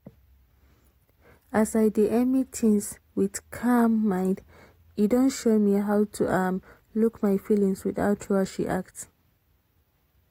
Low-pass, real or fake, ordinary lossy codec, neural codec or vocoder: 19.8 kHz; real; AAC, 48 kbps; none